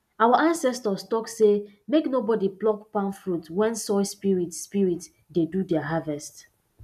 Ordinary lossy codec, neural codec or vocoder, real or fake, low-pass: none; none; real; 14.4 kHz